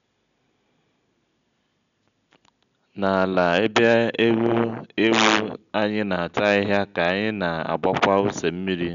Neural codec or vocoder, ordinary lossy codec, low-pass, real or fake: none; none; 7.2 kHz; real